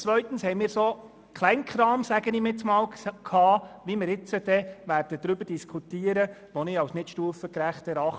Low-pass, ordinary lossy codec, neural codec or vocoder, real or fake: none; none; none; real